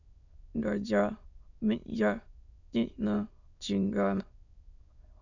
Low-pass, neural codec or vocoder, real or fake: 7.2 kHz; autoencoder, 22.05 kHz, a latent of 192 numbers a frame, VITS, trained on many speakers; fake